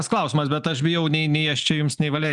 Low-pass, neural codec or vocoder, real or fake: 10.8 kHz; none; real